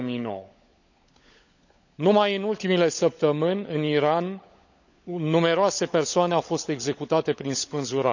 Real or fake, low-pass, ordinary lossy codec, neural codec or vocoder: fake; 7.2 kHz; none; codec, 16 kHz, 16 kbps, FunCodec, trained on LibriTTS, 50 frames a second